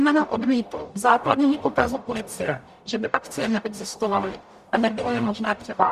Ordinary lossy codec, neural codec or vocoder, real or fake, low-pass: Opus, 64 kbps; codec, 44.1 kHz, 0.9 kbps, DAC; fake; 14.4 kHz